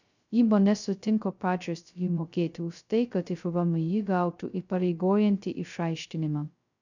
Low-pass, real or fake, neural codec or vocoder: 7.2 kHz; fake; codec, 16 kHz, 0.2 kbps, FocalCodec